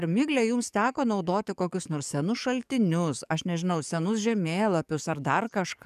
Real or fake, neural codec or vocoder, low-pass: fake; codec, 44.1 kHz, 7.8 kbps, DAC; 14.4 kHz